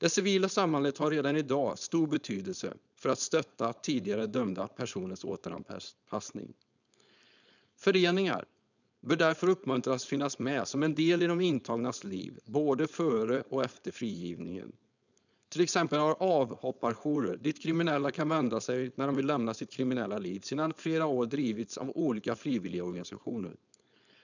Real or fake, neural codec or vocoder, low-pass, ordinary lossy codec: fake; codec, 16 kHz, 4.8 kbps, FACodec; 7.2 kHz; none